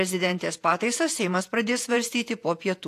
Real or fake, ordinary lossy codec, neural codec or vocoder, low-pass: fake; AAC, 64 kbps; vocoder, 48 kHz, 128 mel bands, Vocos; 14.4 kHz